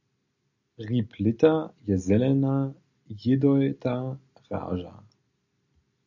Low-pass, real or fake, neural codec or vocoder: 7.2 kHz; real; none